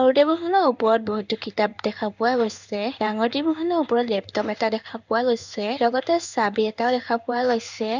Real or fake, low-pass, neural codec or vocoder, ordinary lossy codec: fake; 7.2 kHz; codec, 16 kHz in and 24 kHz out, 1 kbps, XY-Tokenizer; none